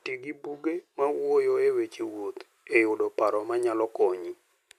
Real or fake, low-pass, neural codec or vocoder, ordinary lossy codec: real; 14.4 kHz; none; none